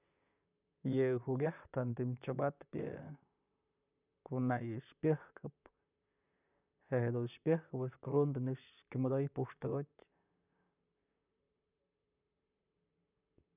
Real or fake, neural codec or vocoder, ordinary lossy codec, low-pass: fake; vocoder, 44.1 kHz, 128 mel bands, Pupu-Vocoder; none; 3.6 kHz